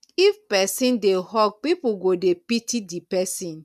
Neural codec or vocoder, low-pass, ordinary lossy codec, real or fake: none; 14.4 kHz; none; real